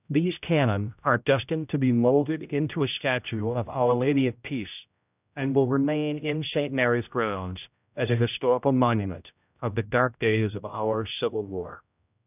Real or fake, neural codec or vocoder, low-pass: fake; codec, 16 kHz, 0.5 kbps, X-Codec, HuBERT features, trained on general audio; 3.6 kHz